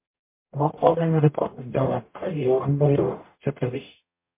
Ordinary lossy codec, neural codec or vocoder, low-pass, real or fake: MP3, 24 kbps; codec, 44.1 kHz, 0.9 kbps, DAC; 3.6 kHz; fake